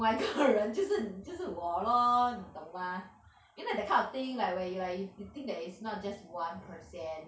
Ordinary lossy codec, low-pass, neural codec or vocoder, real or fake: none; none; none; real